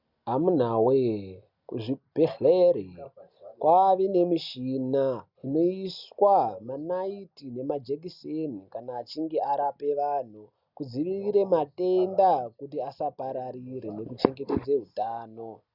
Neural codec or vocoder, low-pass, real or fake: none; 5.4 kHz; real